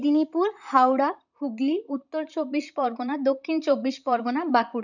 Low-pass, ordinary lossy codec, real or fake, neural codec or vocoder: 7.2 kHz; none; fake; vocoder, 44.1 kHz, 128 mel bands, Pupu-Vocoder